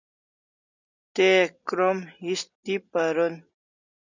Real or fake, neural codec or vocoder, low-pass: real; none; 7.2 kHz